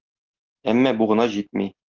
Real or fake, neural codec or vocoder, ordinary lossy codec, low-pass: real; none; Opus, 16 kbps; 7.2 kHz